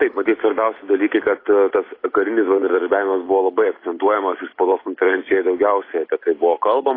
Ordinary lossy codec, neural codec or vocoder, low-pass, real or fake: AAC, 24 kbps; none; 5.4 kHz; real